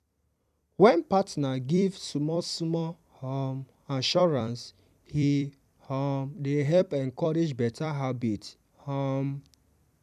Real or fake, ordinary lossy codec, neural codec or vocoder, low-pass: fake; none; vocoder, 44.1 kHz, 128 mel bands every 256 samples, BigVGAN v2; 14.4 kHz